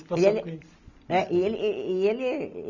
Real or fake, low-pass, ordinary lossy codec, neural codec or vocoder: real; 7.2 kHz; none; none